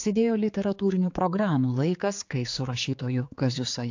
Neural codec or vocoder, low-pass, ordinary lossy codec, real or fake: codec, 16 kHz, 4 kbps, X-Codec, HuBERT features, trained on general audio; 7.2 kHz; AAC, 48 kbps; fake